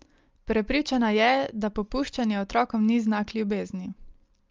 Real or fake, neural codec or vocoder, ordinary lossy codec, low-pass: real; none; Opus, 32 kbps; 7.2 kHz